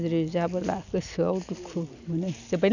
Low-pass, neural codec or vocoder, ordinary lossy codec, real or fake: 7.2 kHz; none; none; real